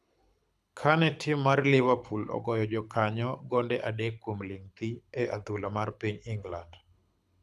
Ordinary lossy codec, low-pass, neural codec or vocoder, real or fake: none; none; codec, 24 kHz, 6 kbps, HILCodec; fake